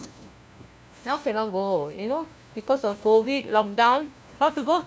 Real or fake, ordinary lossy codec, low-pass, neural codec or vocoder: fake; none; none; codec, 16 kHz, 1 kbps, FunCodec, trained on LibriTTS, 50 frames a second